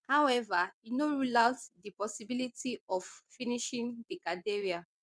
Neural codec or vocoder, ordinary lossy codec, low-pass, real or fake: vocoder, 22.05 kHz, 80 mel bands, WaveNeXt; none; none; fake